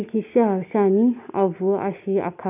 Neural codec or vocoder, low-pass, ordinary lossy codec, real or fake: none; 3.6 kHz; none; real